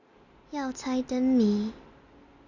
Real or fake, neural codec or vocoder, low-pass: real; none; 7.2 kHz